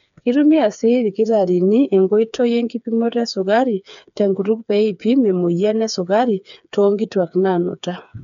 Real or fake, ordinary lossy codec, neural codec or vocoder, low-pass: fake; none; codec, 16 kHz, 4 kbps, FreqCodec, smaller model; 7.2 kHz